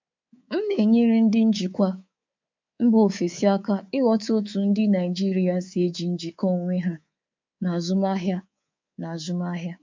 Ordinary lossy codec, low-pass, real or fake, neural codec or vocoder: MP3, 64 kbps; 7.2 kHz; fake; codec, 24 kHz, 3.1 kbps, DualCodec